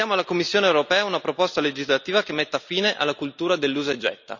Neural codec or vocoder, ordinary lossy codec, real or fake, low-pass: none; none; real; 7.2 kHz